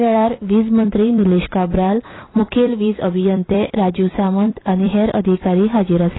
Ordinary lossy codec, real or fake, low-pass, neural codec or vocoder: AAC, 16 kbps; fake; 7.2 kHz; vocoder, 44.1 kHz, 128 mel bands every 256 samples, BigVGAN v2